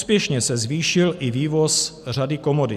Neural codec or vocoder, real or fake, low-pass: none; real; 14.4 kHz